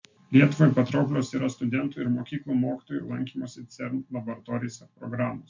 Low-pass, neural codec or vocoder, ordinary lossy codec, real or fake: 7.2 kHz; none; MP3, 64 kbps; real